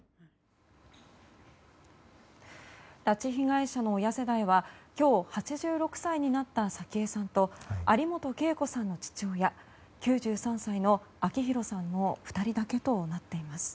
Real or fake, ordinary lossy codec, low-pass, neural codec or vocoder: real; none; none; none